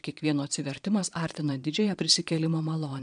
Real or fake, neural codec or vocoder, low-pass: fake; vocoder, 22.05 kHz, 80 mel bands, Vocos; 9.9 kHz